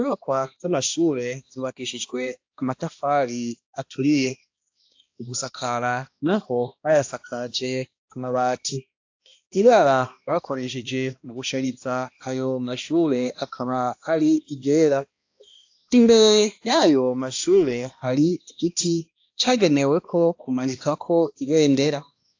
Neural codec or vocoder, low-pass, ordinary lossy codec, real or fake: codec, 16 kHz, 1 kbps, X-Codec, HuBERT features, trained on balanced general audio; 7.2 kHz; AAC, 48 kbps; fake